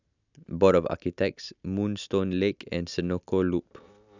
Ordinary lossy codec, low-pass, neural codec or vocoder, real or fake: none; 7.2 kHz; none; real